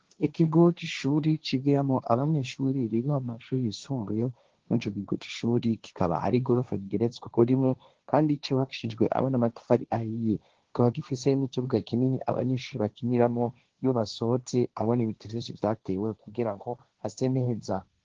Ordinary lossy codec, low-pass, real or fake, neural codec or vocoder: Opus, 16 kbps; 7.2 kHz; fake; codec, 16 kHz, 1.1 kbps, Voila-Tokenizer